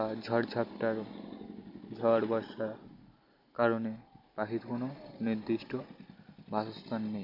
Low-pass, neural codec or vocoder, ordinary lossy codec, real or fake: 5.4 kHz; none; none; real